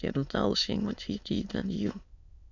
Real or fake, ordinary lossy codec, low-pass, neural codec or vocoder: fake; none; 7.2 kHz; autoencoder, 22.05 kHz, a latent of 192 numbers a frame, VITS, trained on many speakers